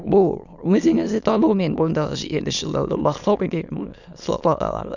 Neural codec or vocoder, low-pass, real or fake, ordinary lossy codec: autoencoder, 22.05 kHz, a latent of 192 numbers a frame, VITS, trained on many speakers; 7.2 kHz; fake; none